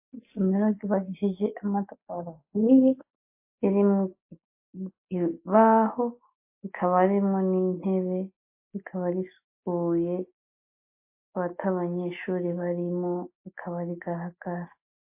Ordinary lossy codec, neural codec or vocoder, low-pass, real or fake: MP3, 24 kbps; none; 3.6 kHz; real